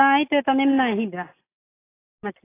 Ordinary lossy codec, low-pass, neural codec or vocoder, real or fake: AAC, 16 kbps; 3.6 kHz; none; real